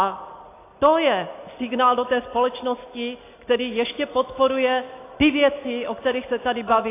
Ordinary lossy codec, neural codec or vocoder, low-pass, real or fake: AAC, 24 kbps; none; 3.6 kHz; real